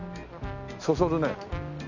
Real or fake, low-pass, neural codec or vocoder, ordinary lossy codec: real; 7.2 kHz; none; none